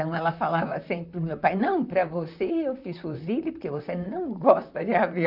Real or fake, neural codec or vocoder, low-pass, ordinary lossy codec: fake; vocoder, 44.1 kHz, 128 mel bands, Pupu-Vocoder; 5.4 kHz; none